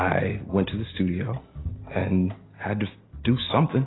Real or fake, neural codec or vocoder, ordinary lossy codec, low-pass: real; none; AAC, 16 kbps; 7.2 kHz